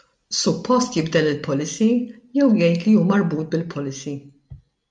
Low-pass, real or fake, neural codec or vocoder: 9.9 kHz; real; none